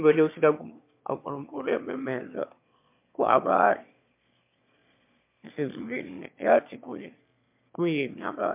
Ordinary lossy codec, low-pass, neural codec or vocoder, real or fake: MP3, 32 kbps; 3.6 kHz; autoencoder, 22.05 kHz, a latent of 192 numbers a frame, VITS, trained on one speaker; fake